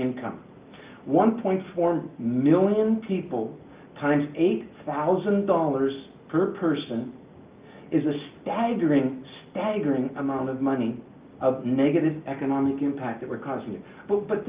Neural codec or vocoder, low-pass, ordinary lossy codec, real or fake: none; 3.6 kHz; Opus, 24 kbps; real